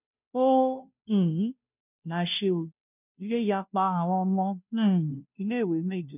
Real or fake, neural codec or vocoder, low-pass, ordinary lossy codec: fake; codec, 16 kHz, 0.5 kbps, FunCodec, trained on Chinese and English, 25 frames a second; 3.6 kHz; none